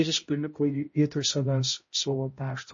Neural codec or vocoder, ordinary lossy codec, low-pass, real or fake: codec, 16 kHz, 0.5 kbps, X-Codec, HuBERT features, trained on balanced general audio; MP3, 32 kbps; 7.2 kHz; fake